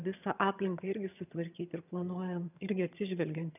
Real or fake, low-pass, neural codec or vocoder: fake; 3.6 kHz; vocoder, 22.05 kHz, 80 mel bands, HiFi-GAN